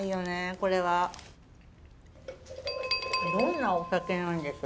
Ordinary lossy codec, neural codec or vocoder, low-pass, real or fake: none; none; none; real